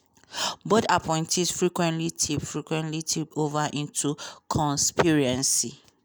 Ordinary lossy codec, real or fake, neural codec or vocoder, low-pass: none; real; none; none